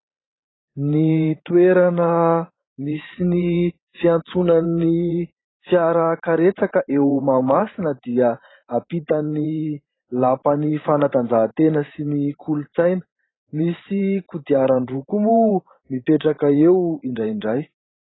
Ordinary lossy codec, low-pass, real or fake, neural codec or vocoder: AAC, 16 kbps; 7.2 kHz; fake; vocoder, 44.1 kHz, 128 mel bands every 512 samples, BigVGAN v2